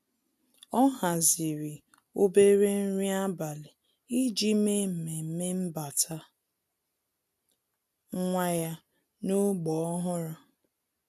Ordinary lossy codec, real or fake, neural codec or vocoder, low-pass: AAC, 96 kbps; real; none; 14.4 kHz